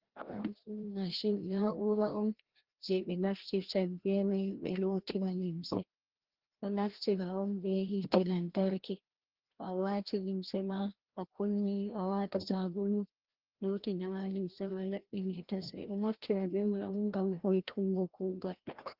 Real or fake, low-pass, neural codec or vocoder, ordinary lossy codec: fake; 5.4 kHz; codec, 16 kHz, 1 kbps, FreqCodec, larger model; Opus, 16 kbps